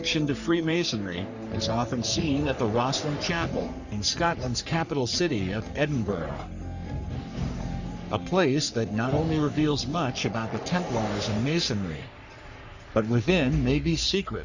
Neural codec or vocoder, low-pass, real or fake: codec, 44.1 kHz, 3.4 kbps, Pupu-Codec; 7.2 kHz; fake